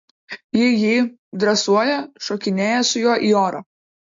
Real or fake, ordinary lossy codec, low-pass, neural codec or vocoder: real; MP3, 48 kbps; 7.2 kHz; none